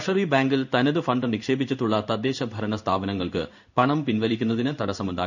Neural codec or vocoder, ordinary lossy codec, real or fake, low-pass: codec, 16 kHz in and 24 kHz out, 1 kbps, XY-Tokenizer; none; fake; 7.2 kHz